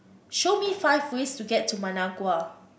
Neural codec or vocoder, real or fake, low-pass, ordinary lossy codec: none; real; none; none